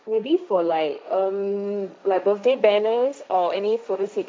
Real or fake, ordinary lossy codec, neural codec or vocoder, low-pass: fake; none; codec, 16 kHz, 1.1 kbps, Voila-Tokenizer; none